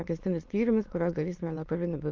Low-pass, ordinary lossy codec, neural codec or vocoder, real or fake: 7.2 kHz; Opus, 24 kbps; autoencoder, 22.05 kHz, a latent of 192 numbers a frame, VITS, trained on many speakers; fake